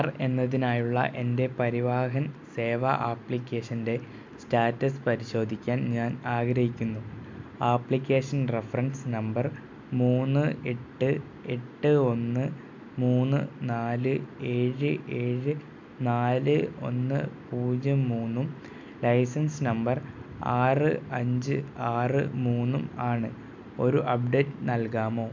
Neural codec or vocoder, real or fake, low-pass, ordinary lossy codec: none; real; 7.2 kHz; MP3, 64 kbps